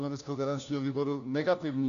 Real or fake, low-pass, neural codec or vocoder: fake; 7.2 kHz; codec, 16 kHz, 1 kbps, FunCodec, trained on LibriTTS, 50 frames a second